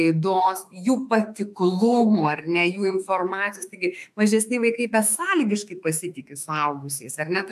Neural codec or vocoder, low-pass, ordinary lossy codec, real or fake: autoencoder, 48 kHz, 32 numbers a frame, DAC-VAE, trained on Japanese speech; 14.4 kHz; AAC, 96 kbps; fake